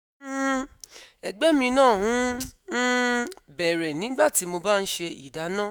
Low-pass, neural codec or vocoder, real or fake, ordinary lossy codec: none; autoencoder, 48 kHz, 128 numbers a frame, DAC-VAE, trained on Japanese speech; fake; none